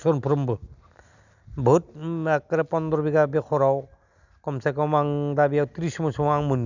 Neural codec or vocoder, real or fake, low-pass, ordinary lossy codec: none; real; 7.2 kHz; none